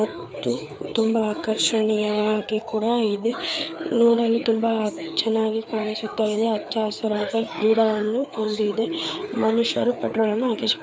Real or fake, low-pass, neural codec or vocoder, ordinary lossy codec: fake; none; codec, 16 kHz, 4 kbps, FreqCodec, larger model; none